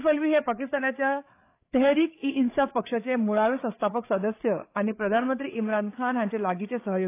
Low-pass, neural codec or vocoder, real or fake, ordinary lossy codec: 3.6 kHz; codec, 16 kHz, 8 kbps, FreqCodec, larger model; fake; AAC, 24 kbps